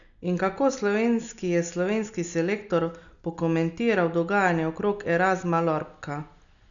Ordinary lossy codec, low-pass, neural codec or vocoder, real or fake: none; 7.2 kHz; none; real